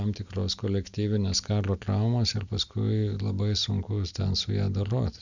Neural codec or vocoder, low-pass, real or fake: none; 7.2 kHz; real